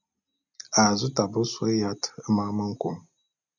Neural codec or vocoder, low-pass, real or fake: none; 7.2 kHz; real